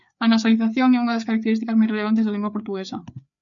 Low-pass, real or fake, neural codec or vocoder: 7.2 kHz; fake; codec, 16 kHz, 4 kbps, FreqCodec, larger model